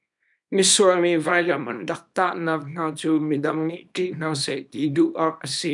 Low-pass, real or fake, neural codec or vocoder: 10.8 kHz; fake; codec, 24 kHz, 0.9 kbps, WavTokenizer, small release